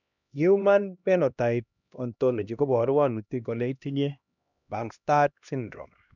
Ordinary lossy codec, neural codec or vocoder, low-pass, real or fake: none; codec, 16 kHz, 1 kbps, X-Codec, HuBERT features, trained on LibriSpeech; 7.2 kHz; fake